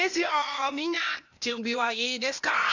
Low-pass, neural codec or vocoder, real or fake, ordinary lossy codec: 7.2 kHz; codec, 16 kHz, 0.8 kbps, ZipCodec; fake; none